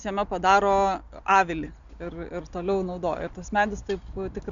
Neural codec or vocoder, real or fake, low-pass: none; real; 7.2 kHz